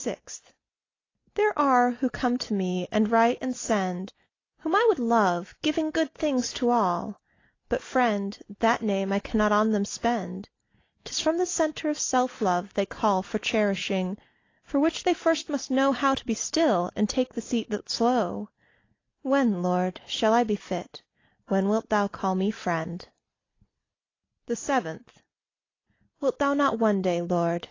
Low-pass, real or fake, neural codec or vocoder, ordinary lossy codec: 7.2 kHz; real; none; AAC, 32 kbps